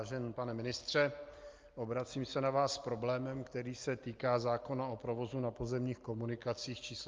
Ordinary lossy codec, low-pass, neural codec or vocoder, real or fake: Opus, 32 kbps; 7.2 kHz; none; real